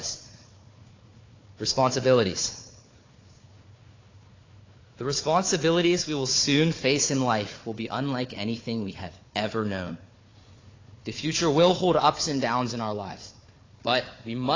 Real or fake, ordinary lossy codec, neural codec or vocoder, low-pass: fake; AAC, 32 kbps; codec, 16 kHz, 4 kbps, FunCodec, trained on Chinese and English, 50 frames a second; 7.2 kHz